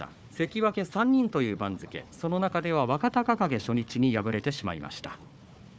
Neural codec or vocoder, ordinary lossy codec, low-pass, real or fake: codec, 16 kHz, 4 kbps, FunCodec, trained on Chinese and English, 50 frames a second; none; none; fake